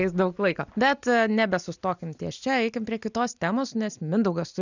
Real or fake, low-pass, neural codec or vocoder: real; 7.2 kHz; none